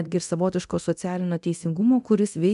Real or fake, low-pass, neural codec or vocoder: fake; 10.8 kHz; codec, 24 kHz, 0.9 kbps, DualCodec